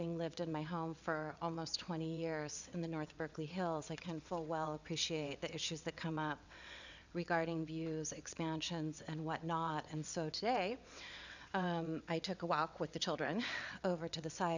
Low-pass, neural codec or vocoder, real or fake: 7.2 kHz; vocoder, 22.05 kHz, 80 mel bands, WaveNeXt; fake